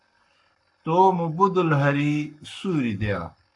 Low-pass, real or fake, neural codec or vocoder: 10.8 kHz; fake; codec, 44.1 kHz, 7.8 kbps, Pupu-Codec